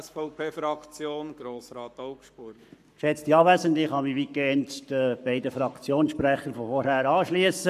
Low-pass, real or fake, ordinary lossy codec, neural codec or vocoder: 14.4 kHz; fake; none; codec, 44.1 kHz, 7.8 kbps, Pupu-Codec